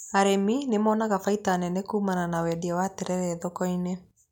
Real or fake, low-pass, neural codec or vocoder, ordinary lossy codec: real; 19.8 kHz; none; none